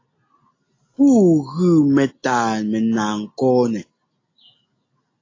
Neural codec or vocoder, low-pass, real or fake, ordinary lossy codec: none; 7.2 kHz; real; AAC, 32 kbps